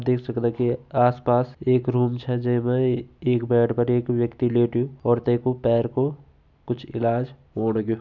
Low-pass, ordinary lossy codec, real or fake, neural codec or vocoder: 7.2 kHz; none; real; none